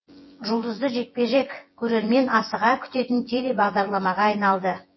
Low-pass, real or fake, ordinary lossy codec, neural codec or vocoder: 7.2 kHz; fake; MP3, 24 kbps; vocoder, 24 kHz, 100 mel bands, Vocos